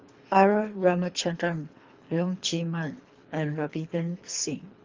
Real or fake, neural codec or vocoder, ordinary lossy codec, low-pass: fake; codec, 24 kHz, 3 kbps, HILCodec; Opus, 32 kbps; 7.2 kHz